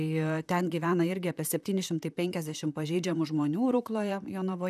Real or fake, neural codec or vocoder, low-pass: fake; vocoder, 44.1 kHz, 128 mel bands, Pupu-Vocoder; 14.4 kHz